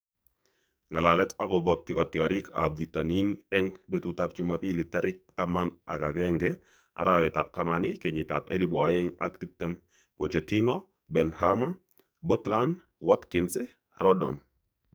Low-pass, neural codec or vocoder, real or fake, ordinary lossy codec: none; codec, 44.1 kHz, 2.6 kbps, SNAC; fake; none